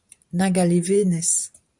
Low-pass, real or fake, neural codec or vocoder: 10.8 kHz; fake; vocoder, 44.1 kHz, 128 mel bands every 512 samples, BigVGAN v2